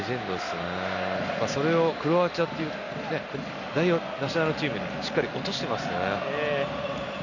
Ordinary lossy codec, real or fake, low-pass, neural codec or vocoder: none; real; 7.2 kHz; none